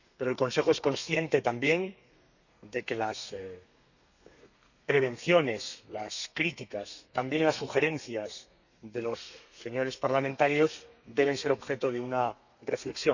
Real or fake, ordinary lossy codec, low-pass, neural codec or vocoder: fake; none; 7.2 kHz; codec, 32 kHz, 1.9 kbps, SNAC